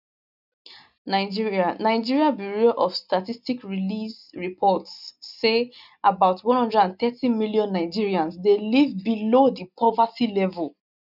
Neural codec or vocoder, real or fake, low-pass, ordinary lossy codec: none; real; 5.4 kHz; none